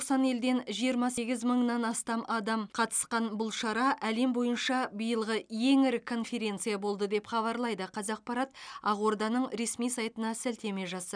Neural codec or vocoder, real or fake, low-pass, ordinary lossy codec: none; real; 9.9 kHz; none